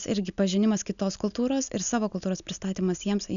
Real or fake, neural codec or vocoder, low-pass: real; none; 7.2 kHz